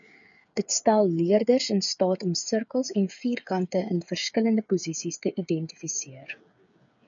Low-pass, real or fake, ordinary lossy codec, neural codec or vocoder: 7.2 kHz; fake; MP3, 96 kbps; codec, 16 kHz, 8 kbps, FreqCodec, smaller model